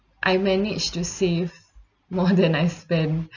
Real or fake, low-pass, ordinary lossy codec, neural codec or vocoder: real; 7.2 kHz; none; none